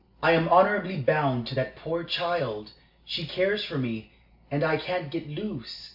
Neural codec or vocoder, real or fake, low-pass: none; real; 5.4 kHz